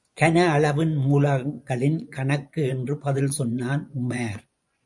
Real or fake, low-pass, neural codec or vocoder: fake; 10.8 kHz; vocoder, 24 kHz, 100 mel bands, Vocos